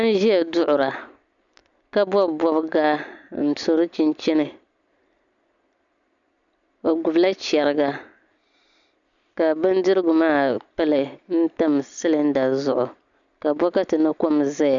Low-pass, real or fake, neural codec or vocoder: 7.2 kHz; real; none